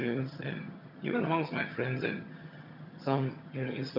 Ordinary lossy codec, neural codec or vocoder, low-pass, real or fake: none; vocoder, 22.05 kHz, 80 mel bands, HiFi-GAN; 5.4 kHz; fake